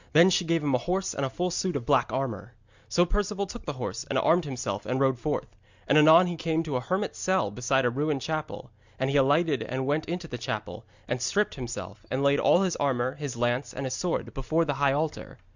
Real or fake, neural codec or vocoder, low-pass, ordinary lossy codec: real; none; 7.2 kHz; Opus, 64 kbps